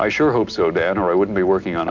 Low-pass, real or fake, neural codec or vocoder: 7.2 kHz; real; none